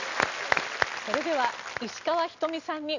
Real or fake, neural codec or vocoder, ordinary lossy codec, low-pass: real; none; none; 7.2 kHz